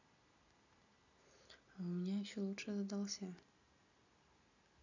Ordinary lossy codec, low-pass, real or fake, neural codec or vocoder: none; 7.2 kHz; real; none